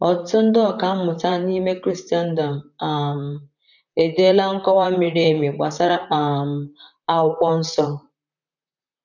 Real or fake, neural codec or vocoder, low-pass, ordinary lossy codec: fake; vocoder, 44.1 kHz, 128 mel bands, Pupu-Vocoder; 7.2 kHz; none